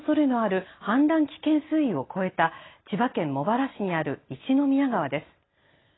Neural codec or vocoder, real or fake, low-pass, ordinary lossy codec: none; real; 7.2 kHz; AAC, 16 kbps